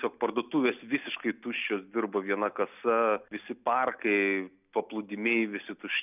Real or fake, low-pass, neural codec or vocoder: real; 3.6 kHz; none